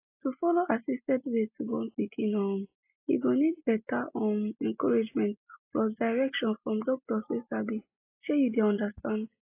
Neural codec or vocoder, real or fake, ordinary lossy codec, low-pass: none; real; AAC, 24 kbps; 3.6 kHz